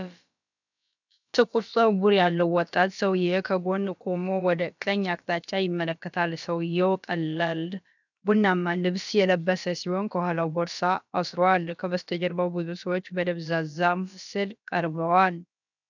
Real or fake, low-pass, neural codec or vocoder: fake; 7.2 kHz; codec, 16 kHz, about 1 kbps, DyCAST, with the encoder's durations